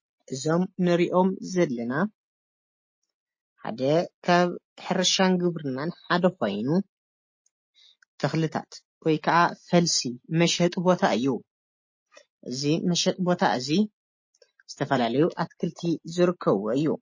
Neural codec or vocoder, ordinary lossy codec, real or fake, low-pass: none; MP3, 32 kbps; real; 7.2 kHz